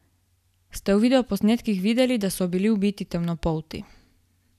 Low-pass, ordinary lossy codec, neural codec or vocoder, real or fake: 14.4 kHz; none; none; real